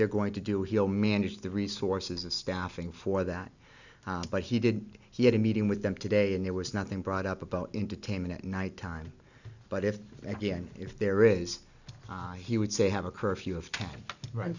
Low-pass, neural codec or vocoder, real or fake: 7.2 kHz; none; real